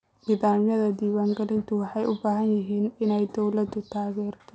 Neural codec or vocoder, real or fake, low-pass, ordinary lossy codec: none; real; none; none